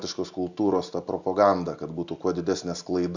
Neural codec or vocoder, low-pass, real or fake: none; 7.2 kHz; real